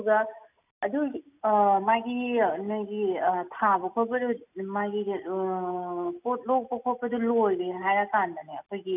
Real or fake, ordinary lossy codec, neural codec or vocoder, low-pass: real; none; none; 3.6 kHz